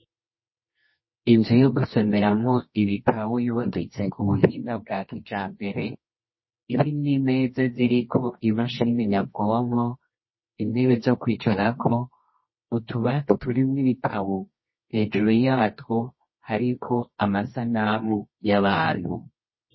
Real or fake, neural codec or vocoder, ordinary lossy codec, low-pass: fake; codec, 24 kHz, 0.9 kbps, WavTokenizer, medium music audio release; MP3, 24 kbps; 7.2 kHz